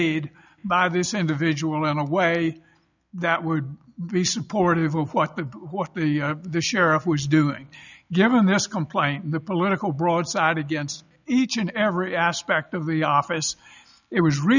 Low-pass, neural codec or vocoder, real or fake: 7.2 kHz; none; real